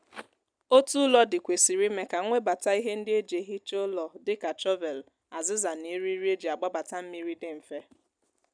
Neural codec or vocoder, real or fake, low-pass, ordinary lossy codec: none; real; 9.9 kHz; none